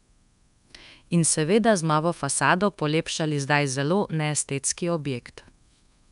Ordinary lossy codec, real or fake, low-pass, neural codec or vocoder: none; fake; 10.8 kHz; codec, 24 kHz, 1.2 kbps, DualCodec